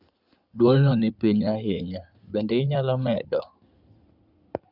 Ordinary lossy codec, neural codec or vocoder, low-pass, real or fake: Opus, 64 kbps; codec, 16 kHz in and 24 kHz out, 2.2 kbps, FireRedTTS-2 codec; 5.4 kHz; fake